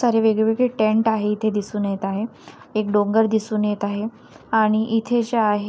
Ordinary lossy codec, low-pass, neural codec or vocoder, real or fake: none; none; none; real